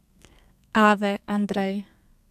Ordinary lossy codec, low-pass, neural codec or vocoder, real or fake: none; 14.4 kHz; codec, 32 kHz, 1.9 kbps, SNAC; fake